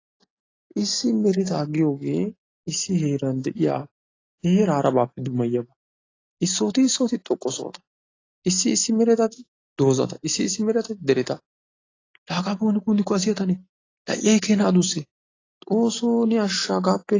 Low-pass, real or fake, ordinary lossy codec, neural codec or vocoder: 7.2 kHz; real; AAC, 32 kbps; none